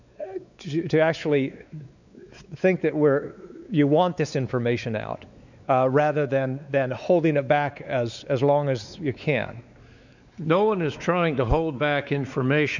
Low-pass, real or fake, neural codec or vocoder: 7.2 kHz; fake; codec, 16 kHz, 4 kbps, X-Codec, WavLM features, trained on Multilingual LibriSpeech